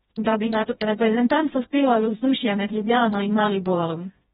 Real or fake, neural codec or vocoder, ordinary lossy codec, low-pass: fake; codec, 16 kHz, 1 kbps, FreqCodec, smaller model; AAC, 16 kbps; 7.2 kHz